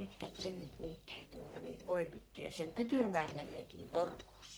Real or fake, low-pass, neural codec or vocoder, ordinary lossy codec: fake; none; codec, 44.1 kHz, 1.7 kbps, Pupu-Codec; none